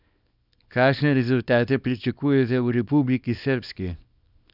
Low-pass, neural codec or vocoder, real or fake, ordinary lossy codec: 5.4 kHz; codec, 24 kHz, 0.9 kbps, WavTokenizer, small release; fake; none